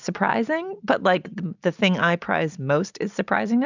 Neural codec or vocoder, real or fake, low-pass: none; real; 7.2 kHz